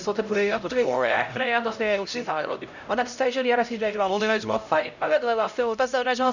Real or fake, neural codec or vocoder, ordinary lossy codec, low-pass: fake; codec, 16 kHz, 0.5 kbps, X-Codec, HuBERT features, trained on LibriSpeech; none; 7.2 kHz